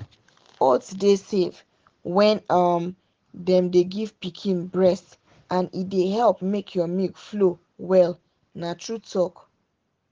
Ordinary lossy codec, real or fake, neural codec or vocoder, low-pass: Opus, 16 kbps; real; none; 7.2 kHz